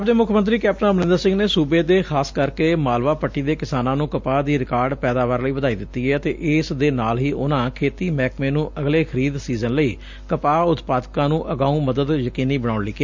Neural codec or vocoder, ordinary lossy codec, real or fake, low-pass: none; MP3, 64 kbps; real; 7.2 kHz